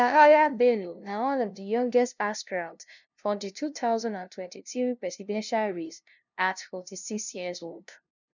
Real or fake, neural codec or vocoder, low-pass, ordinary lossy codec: fake; codec, 16 kHz, 0.5 kbps, FunCodec, trained on LibriTTS, 25 frames a second; 7.2 kHz; none